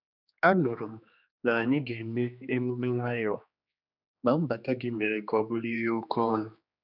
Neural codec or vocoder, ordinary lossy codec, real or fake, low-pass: codec, 16 kHz, 2 kbps, X-Codec, HuBERT features, trained on general audio; none; fake; 5.4 kHz